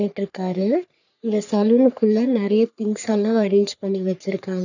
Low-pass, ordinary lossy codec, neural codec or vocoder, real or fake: 7.2 kHz; none; codec, 44.1 kHz, 3.4 kbps, Pupu-Codec; fake